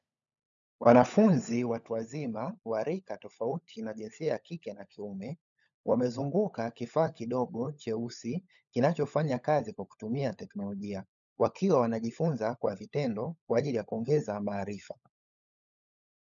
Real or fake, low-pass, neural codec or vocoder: fake; 7.2 kHz; codec, 16 kHz, 16 kbps, FunCodec, trained on LibriTTS, 50 frames a second